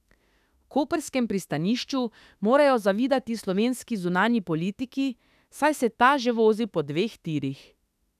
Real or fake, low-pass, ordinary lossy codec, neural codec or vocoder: fake; 14.4 kHz; none; autoencoder, 48 kHz, 32 numbers a frame, DAC-VAE, trained on Japanese speech